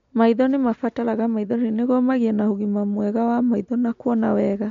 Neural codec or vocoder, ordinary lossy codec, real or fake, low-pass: none; MP3, 48 kbps; real; 7.2 kHz